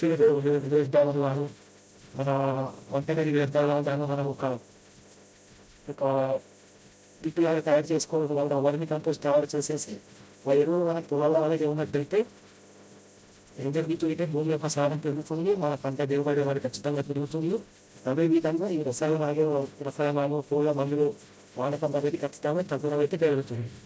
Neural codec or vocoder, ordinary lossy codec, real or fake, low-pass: codec, 16 kHz, 0.5 kbps, FreqCodec, smaller model; none; fake; none